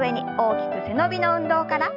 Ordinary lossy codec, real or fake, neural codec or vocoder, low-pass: none; real; none; 5.4 kHz